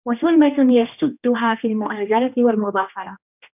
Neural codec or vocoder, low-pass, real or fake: codec, 16 kHz, 1 kbps, X-Codec, HuBERT features, trained on general audio; 3.6 kHz; fake